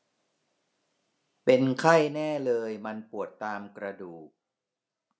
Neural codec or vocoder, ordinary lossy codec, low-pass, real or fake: none; none; none; real